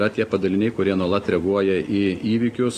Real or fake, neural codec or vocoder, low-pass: real; none; 14.4 kHz